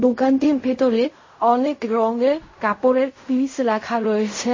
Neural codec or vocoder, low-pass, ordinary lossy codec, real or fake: codec, 16 kHz in and 24 kHz out, 0.4 kbps, LongCat-Audio-Codec, fine tuned four codebook decoder; 7.2 kHz; MP3, 32 kbps; fake